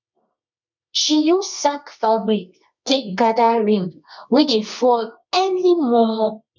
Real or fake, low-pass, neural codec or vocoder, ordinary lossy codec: fake; 7.2 kHz; codec, 24 kHz, 0.9 kbps, WavTokenizer, medium music audio release; none